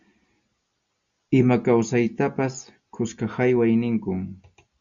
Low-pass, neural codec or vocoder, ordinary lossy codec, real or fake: 7.2 kHz; none; Opus, 64 kbps; real